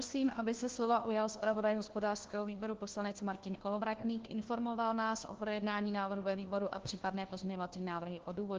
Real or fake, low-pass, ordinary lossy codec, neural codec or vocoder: fake; 7.2 kHz; Opus, 16 kbps; codec, 16 kHz, 1 kbps, FunCodec, trained on LibriTTS, 50 frames a second